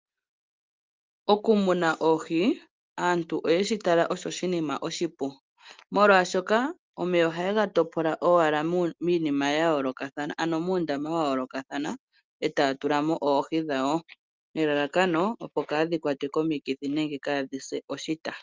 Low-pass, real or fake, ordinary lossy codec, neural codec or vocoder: 7.2 kHz; real; Opus, 32 kbps; none